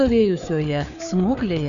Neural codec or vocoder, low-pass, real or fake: codec, 16 kHz, 4 kbps, FreqCodec, larger model; 7.2 kHz; fake